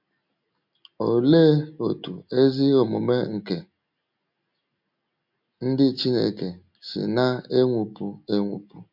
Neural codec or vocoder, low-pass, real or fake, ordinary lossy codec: none; 5.4 kHz; real; MP3, 48 kbps